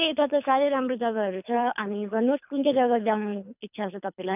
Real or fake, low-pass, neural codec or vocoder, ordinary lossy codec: fake; 3.6 kHz; codec, 24 kHz, 3 kbps, HILCodec; none